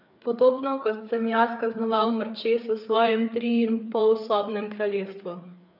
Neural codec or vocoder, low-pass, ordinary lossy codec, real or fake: codec, 16 kHz, 4 kbps, FreqCodec, larger model; 5.4 kHz; none; fake